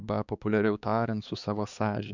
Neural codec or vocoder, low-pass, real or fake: codec, 16 kHz, 4 kbps, X-Codec, HuBERT features, trained on balanced general audio; 7.2 kHz; fake